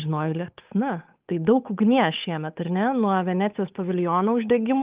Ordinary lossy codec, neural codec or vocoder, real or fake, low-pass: Opus, 32 kbps; codec, 16 kHz, 8 kbps, FunCodec, trained on Chinese and English, 25 frames a second; fake; 3.6 kHz